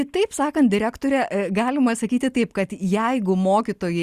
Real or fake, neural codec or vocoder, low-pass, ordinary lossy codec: real; none; 14.4 kHz; Opus, 64 kbps